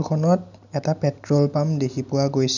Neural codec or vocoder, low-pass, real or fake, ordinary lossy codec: none; 7.2 kHz; real; none